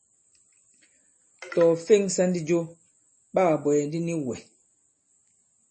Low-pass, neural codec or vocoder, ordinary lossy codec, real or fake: 10.8 kHz; none; MP3, 32 kbps; real